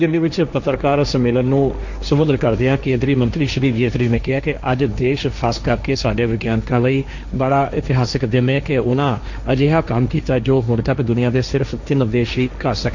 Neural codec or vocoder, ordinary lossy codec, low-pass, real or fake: codec, 16 kHz, 1.1 kbps, Voila-Tokenizer; none; 7.2 kHz; fake